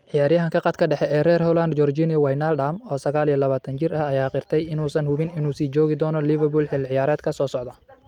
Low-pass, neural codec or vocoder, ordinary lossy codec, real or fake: 19.8 kHz; none; Opus, 32 kbps; real